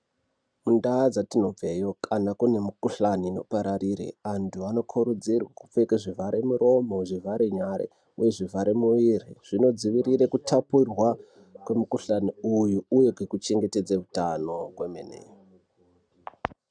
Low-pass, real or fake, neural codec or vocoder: 9.9 kHz; real; none